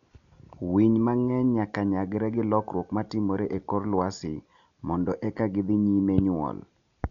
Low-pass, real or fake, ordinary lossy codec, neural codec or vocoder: 7.2 kHz; real; none; none